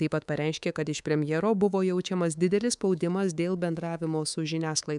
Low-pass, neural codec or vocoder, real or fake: 10.8 kHz; codec, 24 kHz, 3.1 kbps, DualCodec; fake